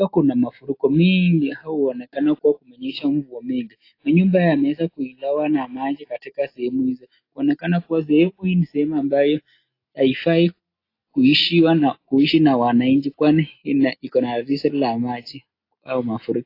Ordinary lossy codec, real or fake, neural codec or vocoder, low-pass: AAC, 32 kbps; real; none; 5.4 kHz